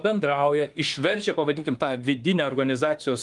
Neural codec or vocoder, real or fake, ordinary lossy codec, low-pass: autoencoder, 48 kHz, 32 numbers a frame, DAC-VAE, trained on Japanese speech; fake; Opus, 32 kbps; 10.8 kHz